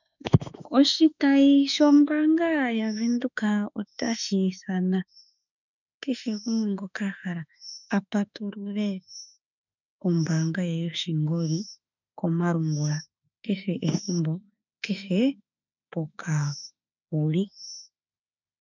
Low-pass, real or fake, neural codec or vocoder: 7.2 kHz; fake; autoencoder, 48 kHz, 32 numbers a frame, DAC-VAE, trained on Japanese speech